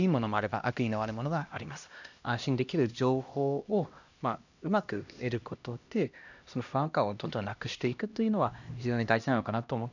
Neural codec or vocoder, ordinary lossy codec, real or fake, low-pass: codec, 16 kHz, 1 kbps, X-Codec, HuBERT features, trained on LibriSpeech; none; fake; 7.2 kHz